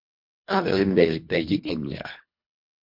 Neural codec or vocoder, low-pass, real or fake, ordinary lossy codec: codec, 24 kHz, 1.5 kbps, HILCodec; 5.4 kHz; fake; MP3, 48 kbps